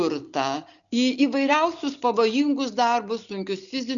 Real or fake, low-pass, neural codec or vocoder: real; 7.2 kHz; none